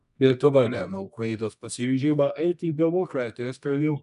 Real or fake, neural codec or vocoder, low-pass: fake; codec, 24 kHz, 0.9 kbps, WavTokenizer, medium music audio release; 10.8 kHz